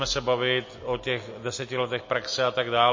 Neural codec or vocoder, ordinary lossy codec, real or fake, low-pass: none; MP3, 32 kbps; real; 7.2 kHz